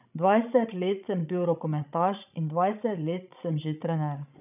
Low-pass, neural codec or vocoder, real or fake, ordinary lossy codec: 3.6 kHz; codec, 16 kHz, 16 kbps, FreqCodec, larger model; fake; none